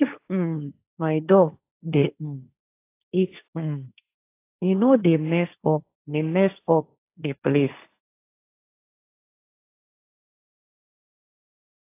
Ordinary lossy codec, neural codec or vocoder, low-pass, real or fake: AAC, 24 kbps; codec, 16 kHz, 1.1 kbps, Voila-Tokenizer; 3.6 kHz; fake